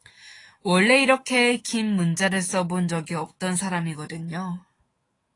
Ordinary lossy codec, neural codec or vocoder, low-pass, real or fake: AAC, 32 kbps; autoencoder, 48 kHz, 128 numbers a frame, DAC-VAE, trained on Japanese speech; 10.8 kHz; fake